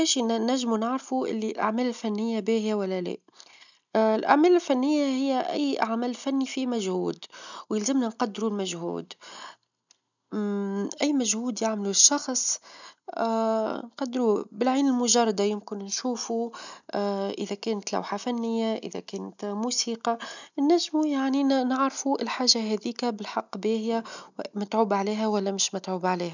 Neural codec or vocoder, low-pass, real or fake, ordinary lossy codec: none; 7.2 kHz; real; none